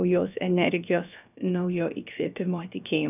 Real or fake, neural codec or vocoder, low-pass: fake; codec, 16 kHz, about 1 kbps, DyCAST, with the encoder's durations; 3.6 kHz